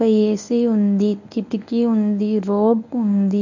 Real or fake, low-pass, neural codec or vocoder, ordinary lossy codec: fake; 7.2 kHz; codec, 24 kHz, 0.9 kbps, WavTokenizer, medium speech release version 1; none